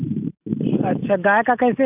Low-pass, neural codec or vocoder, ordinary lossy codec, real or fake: 3.6 kHz; none; none; real